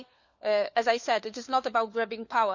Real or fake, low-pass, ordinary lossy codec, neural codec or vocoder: fake; 7.2 kHz; none; codec, 16 kHz, 4 kbps, FunCodec, trained on LibriTTS, 50 frames a second